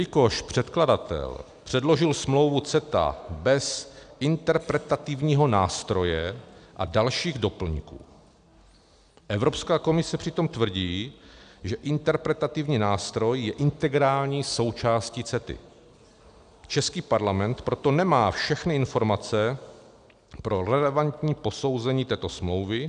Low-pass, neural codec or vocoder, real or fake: 9.9 kHz; none; real